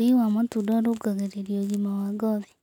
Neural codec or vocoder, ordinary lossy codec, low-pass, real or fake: none; none; 19.8 kHz; real